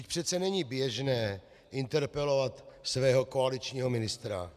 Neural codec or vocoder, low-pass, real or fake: none; 14.4 kHz; real